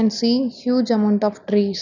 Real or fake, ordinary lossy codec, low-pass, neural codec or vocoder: real; none; 7.2 kHz; none